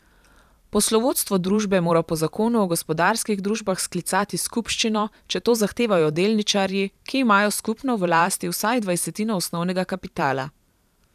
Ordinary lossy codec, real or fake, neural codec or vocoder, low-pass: none; fake; vocoder, 44.1 kHz, 128 mel bands every 512 samples, BigVGAN v2; 14.4 kHz